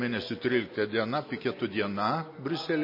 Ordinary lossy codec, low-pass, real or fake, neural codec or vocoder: MP3, 24 kbps; 5.4 kHz; real; none